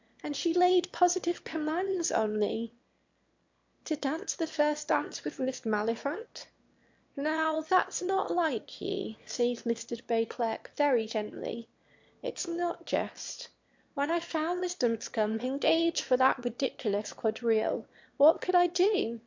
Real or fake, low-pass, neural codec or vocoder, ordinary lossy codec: fake; 7.2 kHz; autoencoder, 22.05 kHz, a latent of 192 numbers a frame, VITS, trained on one speaker; MP3, 48 kbps